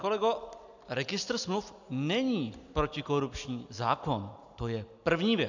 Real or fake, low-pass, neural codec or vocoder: real; 7.2 kHz; none